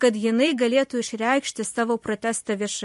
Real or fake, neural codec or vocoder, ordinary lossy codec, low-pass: fake; vocoder, 44.1 kHz, 128 mel bands every 512 samples, BigVGAN v2; MP3, 48 kbps; 14.4 kHz